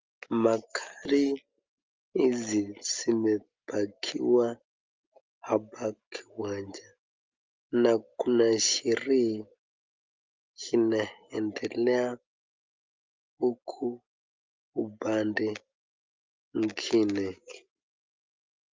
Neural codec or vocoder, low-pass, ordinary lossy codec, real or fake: none; 7.2 kHz; Opus, 24 kbps; real